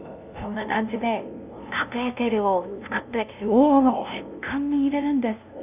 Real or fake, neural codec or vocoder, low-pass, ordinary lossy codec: fake; codec, 16 kHz, 0.5 kbps, FunCodec, trained on LibriTTS, 25 frames a second; 3.6 kHz; none